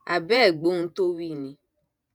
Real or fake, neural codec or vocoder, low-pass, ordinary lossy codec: fake; vocoder, 48 kHz, 128 mel bands, Vocos; none; none